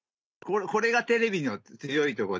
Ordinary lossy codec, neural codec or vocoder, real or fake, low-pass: none; none; real; none